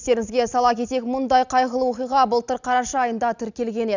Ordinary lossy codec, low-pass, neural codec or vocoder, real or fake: none; 7.2 kHz; none; real